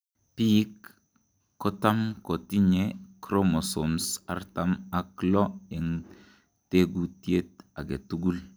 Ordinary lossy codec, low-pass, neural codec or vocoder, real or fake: none; none; none; real